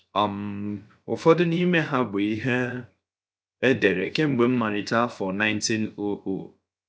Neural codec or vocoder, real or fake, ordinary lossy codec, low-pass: codec, 16 kHz, 0.7 kbps, FocalCodec; fake; none; none